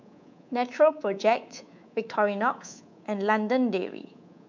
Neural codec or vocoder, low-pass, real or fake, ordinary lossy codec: codec, 24 kHz, 3.1 kbps, DualCodec; 7.2 kHz; fake; MP3, 64 kbps